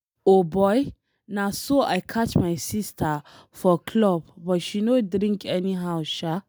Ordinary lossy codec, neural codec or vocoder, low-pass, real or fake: none; none; none; real